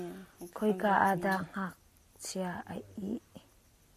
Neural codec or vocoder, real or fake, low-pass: none; real; 14.4 kHz